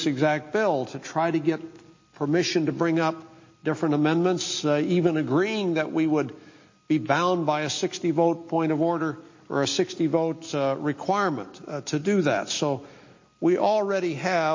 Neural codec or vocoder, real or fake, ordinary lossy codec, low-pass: none; real; MP3, 32 kbps; 7.2 kHz